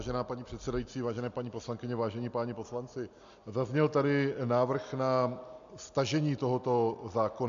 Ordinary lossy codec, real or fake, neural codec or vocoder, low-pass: MP3, 64 kbps; real; none; 7.2 kHz